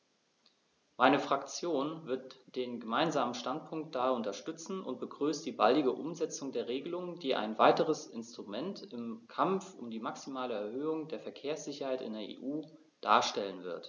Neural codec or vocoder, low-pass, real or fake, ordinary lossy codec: none; 7.2 kHz; real; none